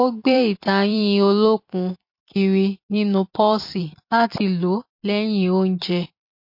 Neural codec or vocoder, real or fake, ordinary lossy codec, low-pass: vocoder, 44.1 kHz, 128 mel bands every 256 samples, BigVGAN v2; fake; MP3, 32 kbps; 5.4 kHz